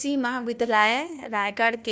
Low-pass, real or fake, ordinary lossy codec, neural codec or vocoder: none; fake; none; codec, 16 kHz, 1 kbps, FunCodec, trained on LibriTTS, 50 frames a second